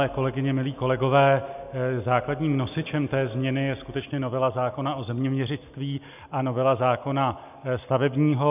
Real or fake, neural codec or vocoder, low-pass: real; none; 3.6 kHz